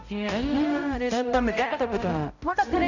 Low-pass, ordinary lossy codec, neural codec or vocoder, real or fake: 7.2 kHz; none; codec, 16 kHz, 0.5 kbps, X-Codec, HuBERT features, trained on balanced general audio; fake